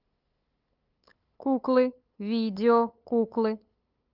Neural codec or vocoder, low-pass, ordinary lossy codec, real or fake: codec, 16 kHz, 8 kbps, FunCodec, trained on LibriTTS, 25 frames a second; 5.4 kHz; Opus, 24 kbps; fake